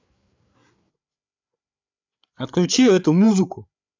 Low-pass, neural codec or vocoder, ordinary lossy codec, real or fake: 7.2 kHz; codec, 16 kHz, 8 kbps, FreqCodec, larger model; MP3, 64 kbps; fake